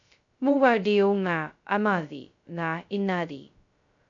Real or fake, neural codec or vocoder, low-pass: fake; codec, 16 kHz, 0.2 kbps, FocalCodec; 7.2 kHz